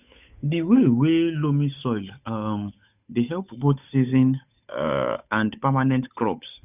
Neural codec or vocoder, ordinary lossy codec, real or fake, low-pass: codec, 16 kHz, 8 kbps, FunCodec, trained on Chinese and English, 25 frames a second; none; fake; 3.6 kHz